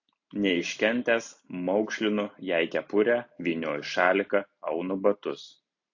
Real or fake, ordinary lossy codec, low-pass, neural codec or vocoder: real; AAC, 48 kbps; 7.2 kHz; none